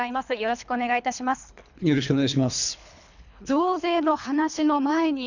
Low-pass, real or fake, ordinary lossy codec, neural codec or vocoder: 7.2 kHz; fake; none; codec, 24 kHz, 3 kbps, HILCodec